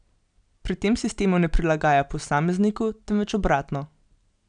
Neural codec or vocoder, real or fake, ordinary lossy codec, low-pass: none; real; none; 9.9 kHz